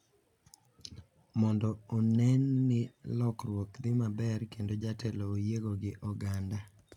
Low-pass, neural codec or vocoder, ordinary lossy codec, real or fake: 19.8 kHz; none; none; real